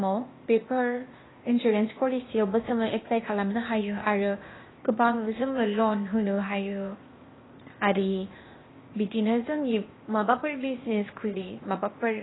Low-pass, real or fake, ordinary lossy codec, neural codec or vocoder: 7.2 kHz; fake; AAC, 16 kbps; codec, 16 kHz, 0.8 kbps, ZipCodec